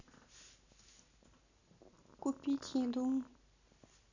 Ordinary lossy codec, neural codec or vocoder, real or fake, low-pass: none; none; real; 7.2 kHz